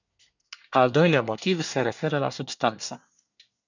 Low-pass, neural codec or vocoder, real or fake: 7.2 kHz; codec, 24 kHz, 1 kbps, SNAC; fake